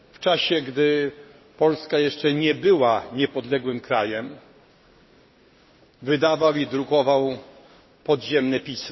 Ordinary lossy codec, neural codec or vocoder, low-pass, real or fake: MP3, 24 kbps; codec, 16 kHz, 6 kbps, DAC; 7.2 kHz; fake